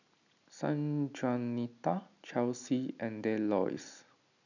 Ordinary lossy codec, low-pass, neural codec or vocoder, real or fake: none; 7.2 kHz; none; real